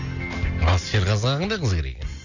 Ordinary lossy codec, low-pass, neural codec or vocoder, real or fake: none; 7.2 kHz; none; real